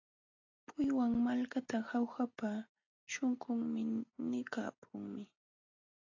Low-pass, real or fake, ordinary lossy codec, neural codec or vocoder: 7.2 kHz; real; AAC, 48 kbps; none